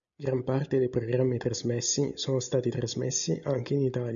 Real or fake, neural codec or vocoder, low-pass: real; none; 7.2 kHz